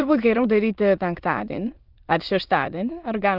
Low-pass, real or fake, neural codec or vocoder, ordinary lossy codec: 5.4 kHz; fake; autoencoder, 22.05 kHz, a latent of 192 numbers a frame, VITS, trained on many speakers; Opus, 24 kbps